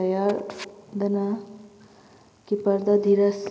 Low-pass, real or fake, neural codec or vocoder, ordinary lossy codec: none; real; none; none